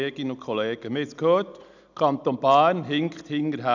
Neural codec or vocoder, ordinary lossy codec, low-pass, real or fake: none; none; 7.2 kHz; real